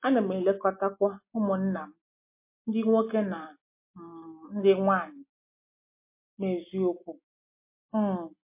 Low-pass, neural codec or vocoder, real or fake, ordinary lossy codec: 3.6 kHz; none; real; MP3, 24 kbps